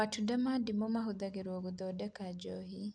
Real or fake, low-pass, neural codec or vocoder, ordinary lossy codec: real; 9.9 kHz; none; none